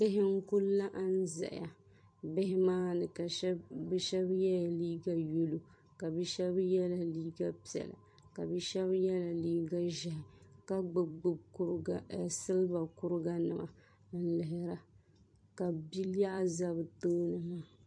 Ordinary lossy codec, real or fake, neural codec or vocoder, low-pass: MP3, 48 kbps; fake; vocoder, 44.1 kHz, 128 mel bands every 256 samples, BigVGAN v2; 9.9 kHz